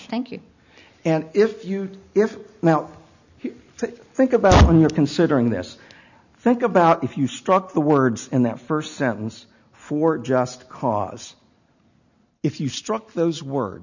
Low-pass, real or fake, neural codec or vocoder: 7.2 kHz; real; none